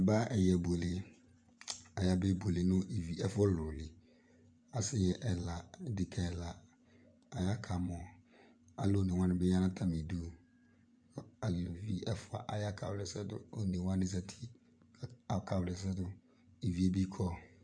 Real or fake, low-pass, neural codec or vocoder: fake; 9.9 kHz; vocoder, 24 kHz, 100 mel bands, Vocos